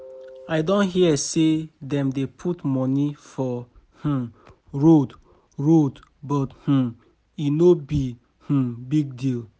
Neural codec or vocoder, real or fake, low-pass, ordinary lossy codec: none; real; none; none